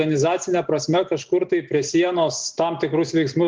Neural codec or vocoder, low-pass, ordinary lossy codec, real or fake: none; 7.2 kHz; Opus, 24 kbps; real